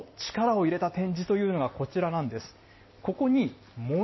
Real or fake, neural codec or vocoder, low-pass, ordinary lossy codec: real; none; 7.2 kHz; MP3, 24 kbps